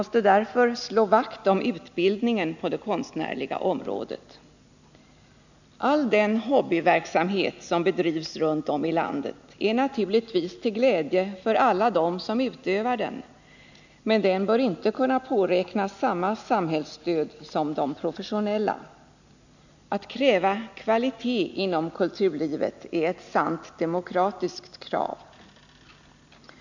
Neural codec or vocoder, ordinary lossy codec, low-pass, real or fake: none; none; 7.2 kHz; real